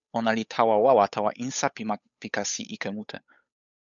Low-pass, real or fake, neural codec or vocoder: 7.2 kHz; fake; codec, 16 kHz, 8 kbps, FunCodec, trained on Chinese and English, 25 frames a second